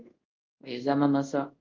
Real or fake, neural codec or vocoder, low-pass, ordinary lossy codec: fake; codec, 24 kHz, 0.5 kbps, DualCodec; 7.2 kHz; Opus, 32 kbps